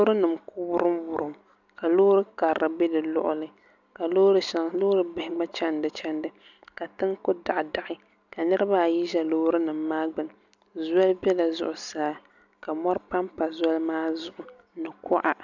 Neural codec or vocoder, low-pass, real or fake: none; 7.2 kHz; real